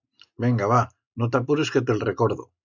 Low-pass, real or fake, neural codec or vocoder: 7.2 kHz; real; none